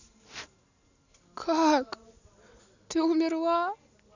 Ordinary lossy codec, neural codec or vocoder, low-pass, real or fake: none; none; 7.2 kHz; real